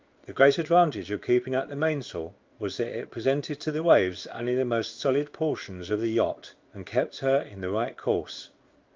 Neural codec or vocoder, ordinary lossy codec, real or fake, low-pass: codec, 16 kHz in and 24 kHz out, 1 kbps, XY-Tokenizer; Opus, 32 kbps; fake; 7.2 kHz